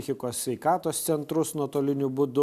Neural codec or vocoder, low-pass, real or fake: none; 14.4 kHz; real